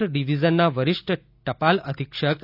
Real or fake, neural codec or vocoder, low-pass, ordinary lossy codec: real; none; 5.4 kHz; none